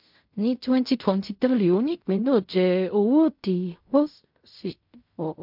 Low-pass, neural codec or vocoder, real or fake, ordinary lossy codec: 5.4 kHz; codec, 16 kHz in and 24 kHz out, 0.4 kbps, LongCat-Audio-Codec, fine tuned four codebook decoder; fake; MP3, 48 kbps